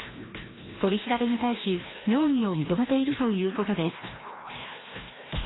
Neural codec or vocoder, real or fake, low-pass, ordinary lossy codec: codec, 16 kHz, 1 kbps, FreqCodec, larger model; fake; 7.2 kHz; AAC, 16 kbps